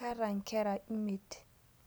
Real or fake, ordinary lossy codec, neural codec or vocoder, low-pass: real; none; none; none